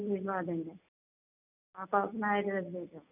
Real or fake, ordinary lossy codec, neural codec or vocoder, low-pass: real; none; none; 3.6 kHz